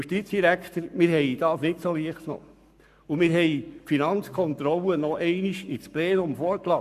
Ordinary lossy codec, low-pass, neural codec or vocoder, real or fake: none; 14.4 kHz; codec, 44.1 kHz, 7.8 kbps, Pupu-Codec; fake